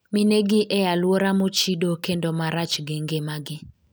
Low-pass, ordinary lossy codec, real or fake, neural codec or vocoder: none; none; real; none